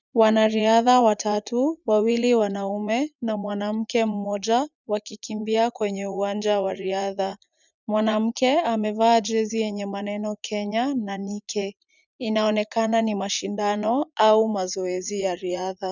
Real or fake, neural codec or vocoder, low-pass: fake; vocoder, 44.1 kHz, 80 mel bands, Vocos; 7.2 kHz